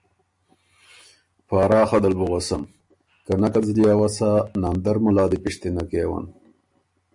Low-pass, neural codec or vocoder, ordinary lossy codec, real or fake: 10.8 kHz; none; MP3, 64 kbps; real